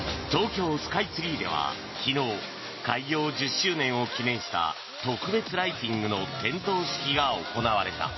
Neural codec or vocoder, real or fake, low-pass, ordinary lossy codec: none; real; 7.2 kHz; MP3, 24 kbps